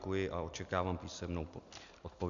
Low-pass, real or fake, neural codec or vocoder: 7.2 kHz; real; none